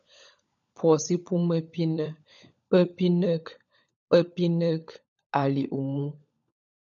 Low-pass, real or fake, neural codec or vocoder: 7.2 kHz; fake; codec, 16 kHz, 16 kbps, FunCodec, trained on LibriTTS, 50 frames a second